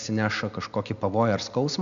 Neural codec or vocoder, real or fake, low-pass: none; real; 7.2 kHz